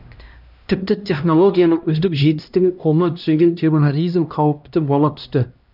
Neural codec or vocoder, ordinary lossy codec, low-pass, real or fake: codec, 16 kHz, 1 kbps, X-Codec, HuBERT features, trained on LibriSpeech; none; 5.4 kHz; fake